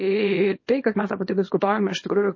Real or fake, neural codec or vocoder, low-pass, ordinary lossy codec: fake; codec, 24 kHz, 0.9 kbps, WavTokenizer, small release; 7.2 kHz; MP3, 32 kbps